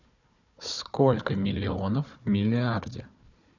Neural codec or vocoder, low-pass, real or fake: codec, 16 kHz, 4 kbps, FunCodec, trained on Chinese and English, 50 frames a second; 7.2 kHz; fake